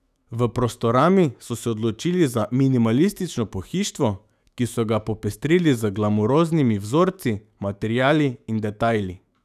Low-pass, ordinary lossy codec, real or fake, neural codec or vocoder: 14.4 kHz; none; fake; autoencoder, 48 kHz, 128 numbers a frame, DAC-VAE, trained on Japanese speech